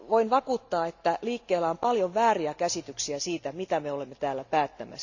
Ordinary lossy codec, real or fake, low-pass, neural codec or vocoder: none; real; 7.2 kHz; none